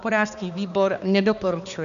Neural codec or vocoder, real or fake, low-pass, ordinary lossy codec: codec, 16 kHz, 2 kbps, X-Codec, HuBERT features, trained on balanced general audio; fake; 7.2 kHz; MP3, 96 kbps